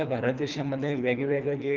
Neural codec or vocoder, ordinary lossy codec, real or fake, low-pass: codec, 24 kHz, 3 kbps, HILCodec; Opus, 24 kbps; fake; 7.2 kHz